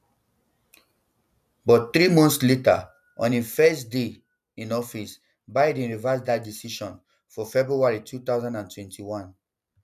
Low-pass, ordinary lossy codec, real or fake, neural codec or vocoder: 14.4 kHz; none; real; none